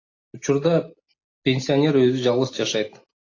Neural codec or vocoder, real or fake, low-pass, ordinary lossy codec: none; real; 7.2 kHz; AAC, 32 kbps